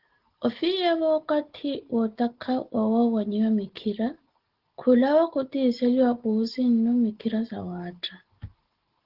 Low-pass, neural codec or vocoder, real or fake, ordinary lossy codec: 5.4 kHz; none; real; Opus, 16 kbps